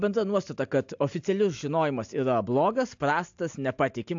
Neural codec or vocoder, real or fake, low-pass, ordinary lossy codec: none; real; 7.2 kHz; AAC, 64 kbps